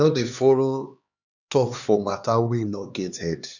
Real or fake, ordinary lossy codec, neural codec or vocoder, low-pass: fake; none; codec, 16 kHz, 2 kbps, X-Codec, HuBERT features, trained on LibriSpeech; 7.2 kHz